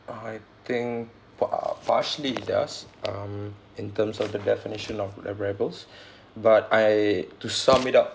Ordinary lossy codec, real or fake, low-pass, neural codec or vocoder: none; real; none; none